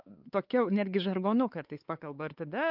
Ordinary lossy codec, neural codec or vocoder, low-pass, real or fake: Opus, 32 kbps; codec, 16 kHz, 4 kbps, X-Codec, WavLM features, trained on Multilingual LibriSpeech; 5.4 kHz; fake